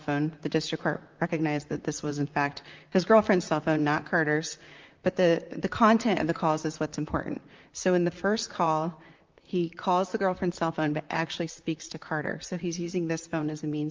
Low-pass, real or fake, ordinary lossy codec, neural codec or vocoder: 7.2 kHz; fake; Opus, 24 kbps; vocoder, 44.1 kHz, 128 mel bands, Pupu-Vocoder